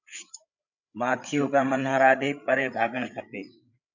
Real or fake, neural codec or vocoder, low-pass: fake; codec, 16 kHz, 8 kbps, FreqCodec, larger model; 7.2 kHz